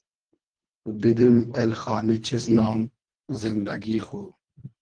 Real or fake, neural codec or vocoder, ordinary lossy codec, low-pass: fake; codec, 24 kHz, 1.5 kbps, HILCodec; Opus, 24 kbps; 9.9 kHz